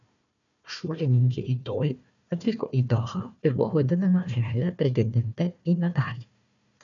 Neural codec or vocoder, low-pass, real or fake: codec, 16 kHz, 1 kbps, FunCodec, trained on Chinese and English, 50 frames a second; 7.2 kHz; fake